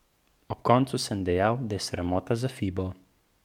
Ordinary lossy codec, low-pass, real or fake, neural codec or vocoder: MP3, 96 kbps; 19.8 kHz; fake; codec, 44.1 kHz, 7.8 kbps, DAC